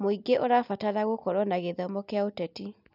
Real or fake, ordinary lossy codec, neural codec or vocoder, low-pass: real; none; none; 5.4 kHz